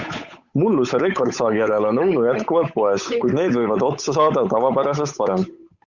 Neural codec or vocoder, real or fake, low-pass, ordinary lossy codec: codec, 16 kHz, 8 kbps, FunCodec, trained on Chinese and English, 25 frames a second; fake; 7.2 kHz; Opus, 64 kbps